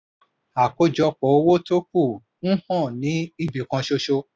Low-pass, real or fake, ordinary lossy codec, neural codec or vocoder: none; real; none; none